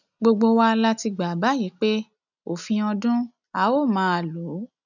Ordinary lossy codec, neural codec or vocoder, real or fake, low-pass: none; none; real; 7.2 kHz